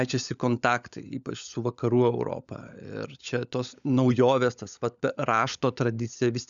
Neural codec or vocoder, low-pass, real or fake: codec, 16 kHz, 16 kbps, FunCodec, trained on LibriTTS, 50 frames a second; 7.2 kHz; fake